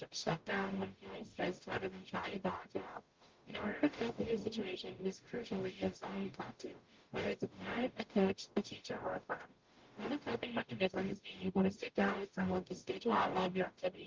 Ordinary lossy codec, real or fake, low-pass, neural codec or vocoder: Opus, 32 kbps; fake; 7.2 kHz; codec, 44.1 kHz, 0.9 kbps, DAC